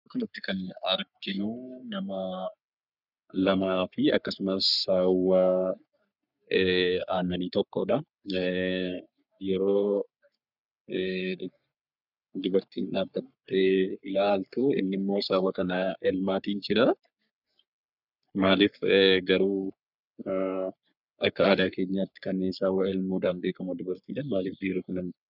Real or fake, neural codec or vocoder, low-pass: fake; codec, 44.1 kHz, 3.4 kbps, Pupu-Codec; 5.4 kHz